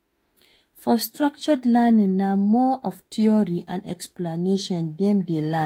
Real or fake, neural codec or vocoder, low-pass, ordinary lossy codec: fake; autoencoder, 48 kHz, 32 numbers a frame, DAC-VAE, trained on Japanese speech; 19.8 kHz; AAC, 48 kbps